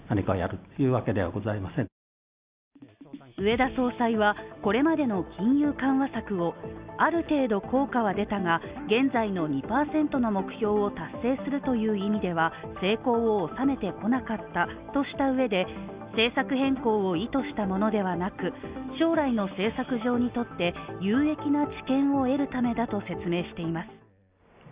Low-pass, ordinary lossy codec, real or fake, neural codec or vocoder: 3.6 kHz; Opus, 64 kbps; real; none